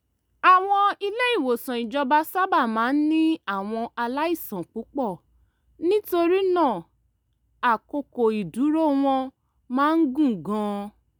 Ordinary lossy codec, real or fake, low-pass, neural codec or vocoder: none; real; none; none